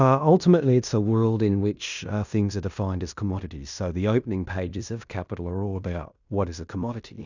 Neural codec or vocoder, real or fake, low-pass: codec, 16 kHz in and 24 kHz out, 0.9 kbps, LongCat-Audio-Codec, fine tuned four codebook decoder; fake; 7.2 kHz